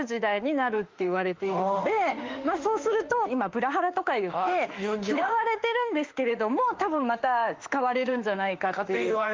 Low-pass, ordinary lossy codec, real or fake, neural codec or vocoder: 7.2 kHz; Opus, 32 kbps; fake; autoencoder, 48 kHz, 32 numbers a frame, DAC-VAE, trained on Japanese speech